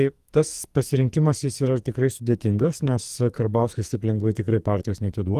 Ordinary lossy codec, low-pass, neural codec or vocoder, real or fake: Opus, 24 kbps; 14.4 kHz; codec, 44.1 kHz, 2.6 kbps, SNAC; fake